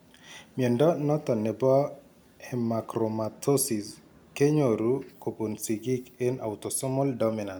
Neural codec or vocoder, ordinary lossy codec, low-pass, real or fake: none; none; none; real